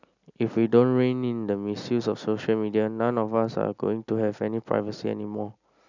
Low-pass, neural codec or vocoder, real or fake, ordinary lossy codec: 7.2 kHz; none; real; none